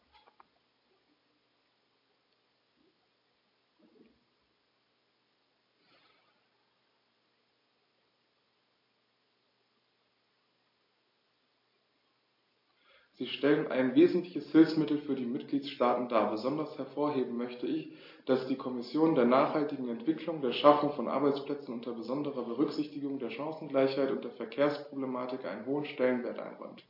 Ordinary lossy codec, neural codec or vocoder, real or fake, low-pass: MP3, 32 kbps; none; real; 5.4 kHz